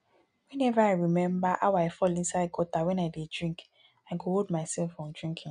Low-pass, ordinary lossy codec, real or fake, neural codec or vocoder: 9.9 kHz; none; real; none